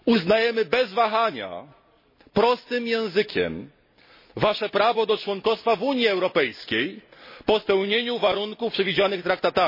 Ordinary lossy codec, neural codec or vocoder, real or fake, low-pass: MP3, 24 kbps; none; real; 5.4 kHz